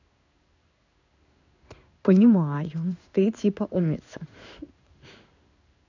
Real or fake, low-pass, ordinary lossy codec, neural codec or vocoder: fake; 7.2 kHz; none; codec, 16 kHz in and 24 kHz out, 1 kbps, XY-Tokenizer